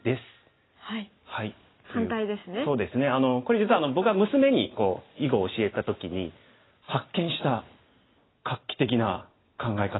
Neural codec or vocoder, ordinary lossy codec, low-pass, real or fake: none; AAC, 16 kbps; 7.2 kHz; real